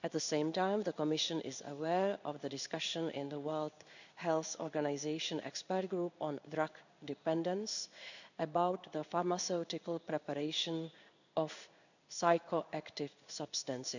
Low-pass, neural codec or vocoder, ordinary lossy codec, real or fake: 7.2 kHz; codec, 16 kHz in and 24 kHz out, 1 kbps, XY-Tokenizer; none; fake